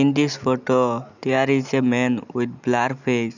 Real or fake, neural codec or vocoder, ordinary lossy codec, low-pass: real; none; none; 7.2 kHz